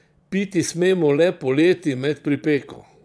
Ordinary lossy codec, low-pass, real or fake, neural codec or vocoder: none; none; fake; vocoder, 22.05 kHz, 80 mel bands, Vocos